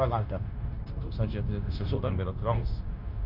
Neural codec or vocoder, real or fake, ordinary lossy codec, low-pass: codec, 16 kHz, 0.9 kbps, LongCat-Audio-Codec; fake; none; 5.4 kHz